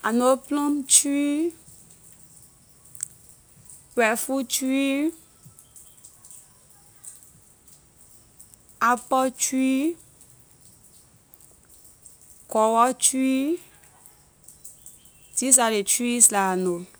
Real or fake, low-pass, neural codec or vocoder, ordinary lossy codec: real; none; none; none